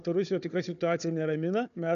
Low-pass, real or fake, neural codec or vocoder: 7.2 kHz; fake; codec, 16 kHz, 4 kbps, FunCodec, trained on Chinese and English, 50 frames a second